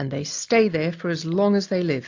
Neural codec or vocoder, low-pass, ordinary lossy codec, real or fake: none; 7.2 kHz; AAC, 48 kbps; real